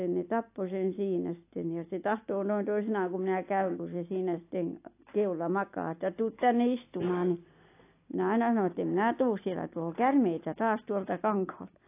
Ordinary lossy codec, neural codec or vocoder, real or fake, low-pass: AAC, 24 kbps; none; real; 3.6 kHz